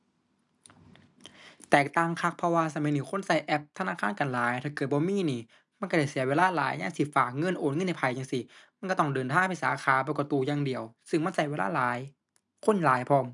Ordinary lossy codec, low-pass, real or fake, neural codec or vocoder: none; 10.8 kHz; real; none